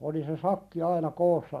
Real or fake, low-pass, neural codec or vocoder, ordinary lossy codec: real; 14.4 kHz; none; none